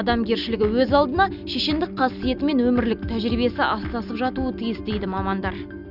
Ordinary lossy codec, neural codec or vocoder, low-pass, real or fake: none; none; 5.4 kHz; real